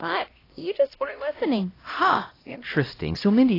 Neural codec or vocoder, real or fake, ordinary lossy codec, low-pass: codec, 16 kHz, 1 kbps, X-Codec, HuBERT features, trained on LibriSpeech; fake; AAC, 24 kbps; 5.4 kHz